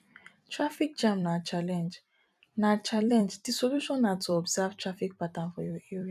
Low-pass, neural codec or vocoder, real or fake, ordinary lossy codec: 14.4 kHz; vocoder, 44.1 kHz, 128 mel bands every 256 samples, BigVGAN v2; fake; none